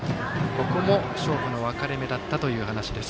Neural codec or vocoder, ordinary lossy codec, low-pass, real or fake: none; none; none; real